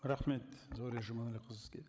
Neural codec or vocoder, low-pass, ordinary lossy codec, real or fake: codec, 16 kHz, 16 kbps, FreqCodec, larger model; none; none; fake